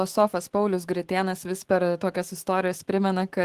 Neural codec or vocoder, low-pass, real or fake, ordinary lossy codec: autoencoder, 48 kHz, 128 numbers a frame, DAC-VAE, trained on Japanese speech; 14.4 kHz; fake; Opus, 16 kbps